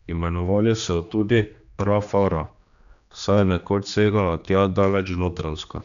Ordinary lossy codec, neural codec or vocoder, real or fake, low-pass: none; codec, 16 kHz, 2 kbps, X-Codec, HuBERT features, trained on general audio; fake; 7.2 kHz